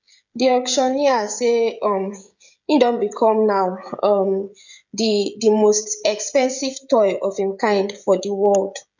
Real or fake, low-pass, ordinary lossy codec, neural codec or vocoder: fake; 7.2 kHz; none; codec, 16 kHz, 16 kbps, FreqCodec, smaller model